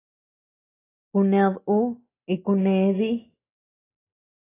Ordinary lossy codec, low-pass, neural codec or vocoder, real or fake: AAC, 16 kbps; 3.6 kHz; none; real